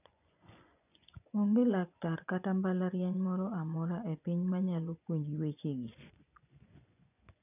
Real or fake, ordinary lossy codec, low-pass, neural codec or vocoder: fake; none; 3.6 kHz; vocoder, 24 kHz, 100 mel bands, Vocos